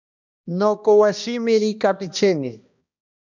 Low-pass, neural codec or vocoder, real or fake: 7.2 kHz; codec, 16 kHz, 1 kbps, X-Codec, HuBERT features, trained on balanced general audio; fake